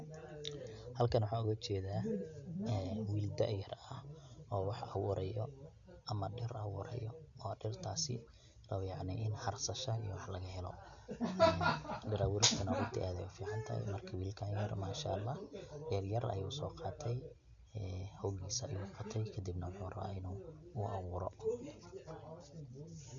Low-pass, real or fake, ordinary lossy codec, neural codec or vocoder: 7.2 kHz; real; MP3, 96 kbps; none